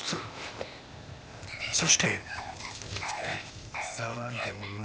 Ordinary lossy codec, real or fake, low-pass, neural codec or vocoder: none; fake; none; codec, 16 kHz, 0.8 kbps, ZipCodec